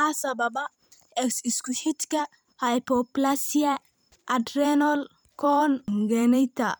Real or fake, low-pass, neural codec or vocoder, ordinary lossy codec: fake; none; vocoder, 44.1 kHz, 128 mel bands every 512 samples, BigVGAN v2; none